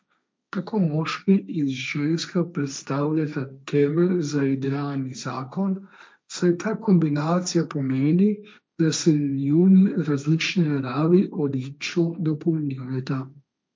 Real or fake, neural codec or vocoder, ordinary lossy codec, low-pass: fake; codec, 16 kHz, 1.1 kbps, Voila-Tokenizer; none; none